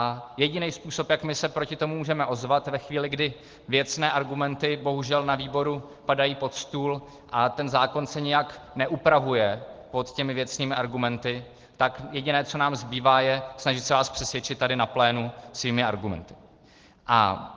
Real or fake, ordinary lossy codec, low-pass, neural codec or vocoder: real; Opus, 16 kbps; 7.2 kHz; none